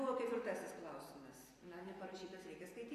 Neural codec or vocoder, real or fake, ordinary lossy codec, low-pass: vocoder, 44.1 kHz, 128 mel bands every 256 samples, BigVGAN v2; fake; AAC, 48 kbps; 14.4 kHz